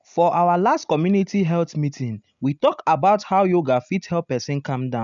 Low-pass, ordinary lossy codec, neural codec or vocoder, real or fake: 7.2 kHz; none; none; real